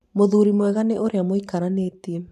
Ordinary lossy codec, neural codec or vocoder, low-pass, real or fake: none; vocoder, 44.1 kHz, 128 mel bands every 512 samples, BigVGAN v2; 14.4 kHz; fake